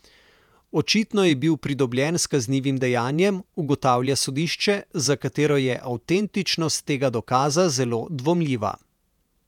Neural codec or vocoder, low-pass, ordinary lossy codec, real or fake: vocoder, 48 kHz, 128 mel bands, Vocos; 19.8 kHz; none; fake